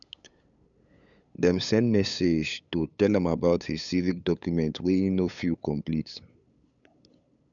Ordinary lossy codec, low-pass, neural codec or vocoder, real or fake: none; 7.2 kHz; codec, 16 kHz, 8 kbps, FunCodec, trained on LibriTTS, 25 frames a second; fake